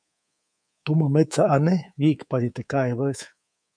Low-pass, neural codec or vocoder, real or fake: 9.9 kHz; codec, 24 kHz, 3.1 kbps, DualCodec; fake